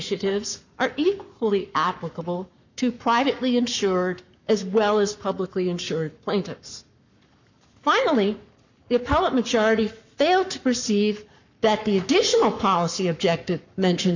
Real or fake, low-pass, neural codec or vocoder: fake; 7.2 kHz; codec, 44.1 kHz, 7.8 kbps, Pupu-Codec